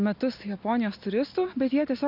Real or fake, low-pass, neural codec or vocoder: real; 5.4 kHz; none